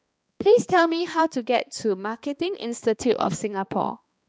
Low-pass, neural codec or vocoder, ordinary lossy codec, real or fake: none; codec, 16 kHz, 2 kbps, X-Codec, HuBERT features, trained on balanced general audio; none; fake